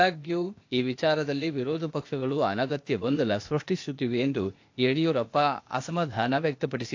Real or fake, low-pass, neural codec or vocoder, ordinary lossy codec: fake; 7.2 kHz; codec, 16 kHz, 0.8 kbps, ZipCodec; AAC, 48 kbps